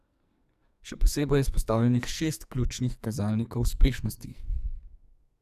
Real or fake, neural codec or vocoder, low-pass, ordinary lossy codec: fake; codec, 44.1 kHz, 2.6 kbps, SNAC; 14.4 kHz; none